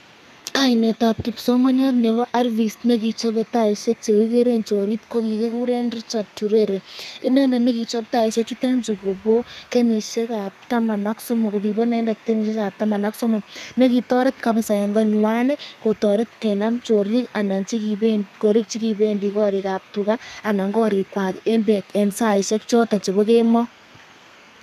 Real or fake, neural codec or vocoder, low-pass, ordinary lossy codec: fake; codec, 32 kHz, 1.9 kbps, SNAC; 14.4 kHz; none